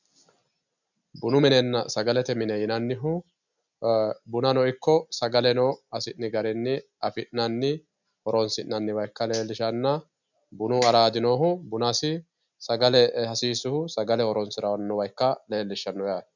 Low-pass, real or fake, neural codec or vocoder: 7.2 kHz; real; none